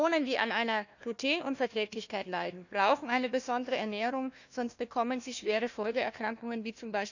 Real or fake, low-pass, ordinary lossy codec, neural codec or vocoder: fake; 7.2 kHz; none; codec, 16 kHz, 1 kbps, FunCodec, trained on Chinese and English, 50 frames a second